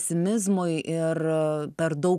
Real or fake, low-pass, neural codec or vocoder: real; 14.4 kHz; none